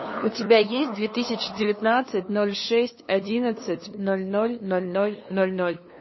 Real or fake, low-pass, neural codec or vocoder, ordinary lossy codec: fake; 7.2 kHz; codec, 16 kHz, 2 kbps, FunCodec, trained on LibriTTS, 25 frames a second; MP3, 24 kbps